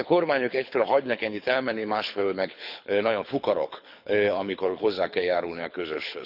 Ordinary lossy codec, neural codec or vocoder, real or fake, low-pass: none; codec, 24 kHz, 6 kbps, HILCodec; fake; 5.4 kHz